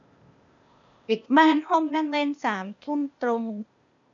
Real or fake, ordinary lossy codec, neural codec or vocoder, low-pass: fake; none; codec, 16 kHz, 0.8 kbps, ZipCodec; 7.2 kHz